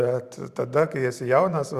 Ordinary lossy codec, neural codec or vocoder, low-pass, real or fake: Opus, 64 kbps; vocoder, 44.1 kHz, 128 mel bands every 512 samples, BigVGAN v2; 14.4 kHz; fake